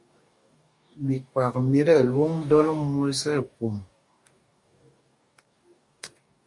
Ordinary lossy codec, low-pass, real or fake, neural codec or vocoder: MP3, 48 kbps; 10.8 kHz; fake; codec, 44.1 kHz, 2.6 kbps, DAC